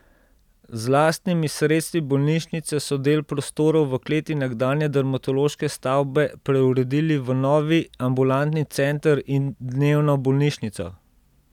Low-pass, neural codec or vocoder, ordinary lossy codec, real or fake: 19.8 kHz; none; none; real